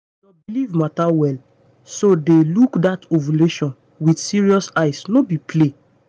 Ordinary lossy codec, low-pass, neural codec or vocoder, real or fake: none; 9.9 kHz; none; real